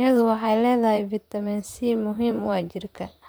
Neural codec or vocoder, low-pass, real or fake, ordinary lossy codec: vocoder, 44.1 kHz, 128 mel bands, Pupu-Vocoder; none; fake; none